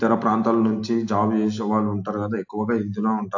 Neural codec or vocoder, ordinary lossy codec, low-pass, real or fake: none; AAC, 48 kbps; 7.2 kHz; real